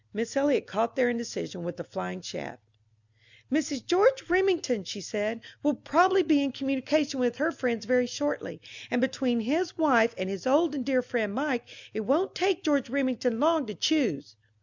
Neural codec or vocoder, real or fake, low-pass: none; real; 7.2 kHz